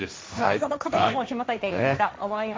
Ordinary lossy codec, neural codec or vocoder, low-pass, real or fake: none; codec, 16 kHz, 1.1 kbps, Voila-Tokenizer; none; fake